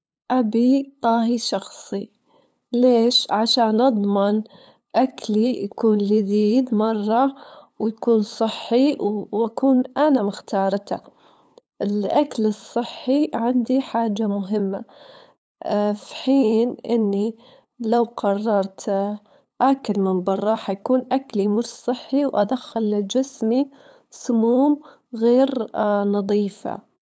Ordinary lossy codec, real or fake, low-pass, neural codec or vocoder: none; fake; none; codec, 16 kHz, 8 kbps, FunCodec, trained on LibriTTS, 25 frames a second